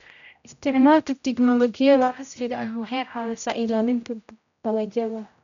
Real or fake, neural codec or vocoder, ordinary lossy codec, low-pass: fake; codec, 16 kHz, 0.5 kbps, X-Codec, HuBERT features, trained on general audio; none; 7.2 kHz